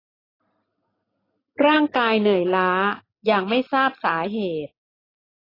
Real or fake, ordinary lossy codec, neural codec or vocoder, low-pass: real; AAC, 24 kbps; none; 5.4 kHz